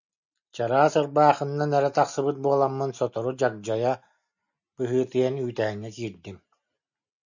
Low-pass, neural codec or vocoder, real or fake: 7.2 kHz; none; real